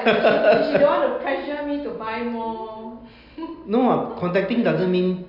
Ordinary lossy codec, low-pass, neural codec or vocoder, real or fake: AAC, 48 kbps; 5.4 kHz; none; real